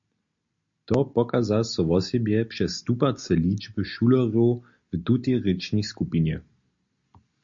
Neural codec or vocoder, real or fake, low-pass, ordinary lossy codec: none; real; 7.2 kHz; MP3, 48 kbps